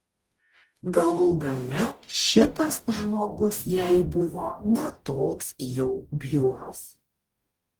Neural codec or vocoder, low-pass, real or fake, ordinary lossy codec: codec, 44.1 kHz, 0.9 kbps, DAC; 19.8 kHz; fake; Opus, 32 kbps